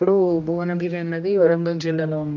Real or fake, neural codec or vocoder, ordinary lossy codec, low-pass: fake; codec, 16 kHz, 1 kbps, X-Codec, HuBERT features, trained on general audio; none; 7.2 kHz